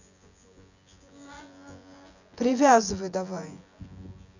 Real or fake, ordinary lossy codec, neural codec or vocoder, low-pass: fake; none; vocoder, 24 kHz, 100 mel bands, Vocos; 7.2 kHz